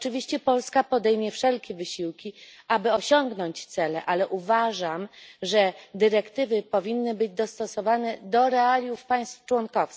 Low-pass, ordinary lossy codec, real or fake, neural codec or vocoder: none; none; real; none